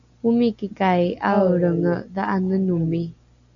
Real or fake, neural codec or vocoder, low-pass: real; none; 7.2 kHz